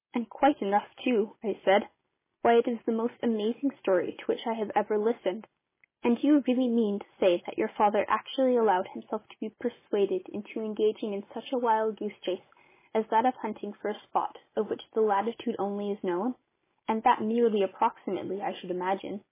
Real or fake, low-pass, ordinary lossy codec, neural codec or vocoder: real; 3.6 kHz; MP3, 16 kbps; none